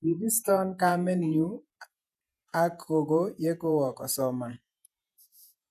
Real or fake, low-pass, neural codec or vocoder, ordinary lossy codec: fake; 14.4 kHz; vocoder, 48 kHz, 128 mel bands, Vocos; none